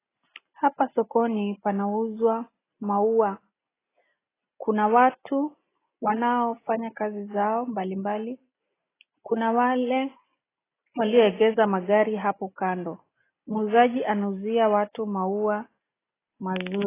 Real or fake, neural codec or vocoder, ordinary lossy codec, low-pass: real; none; AAC, 16 kbps; 3.6 kHz